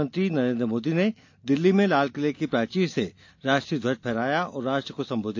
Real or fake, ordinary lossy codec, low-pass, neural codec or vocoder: real; AAC, 48 kbps; 7.2 kHz; none